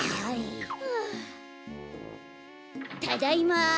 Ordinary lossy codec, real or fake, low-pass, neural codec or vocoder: none; real; none; none